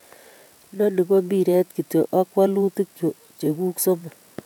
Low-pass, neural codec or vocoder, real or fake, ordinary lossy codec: 19.8 kHz; vocoder, 48 kHz, 128 mel bands, Vocos; fake; none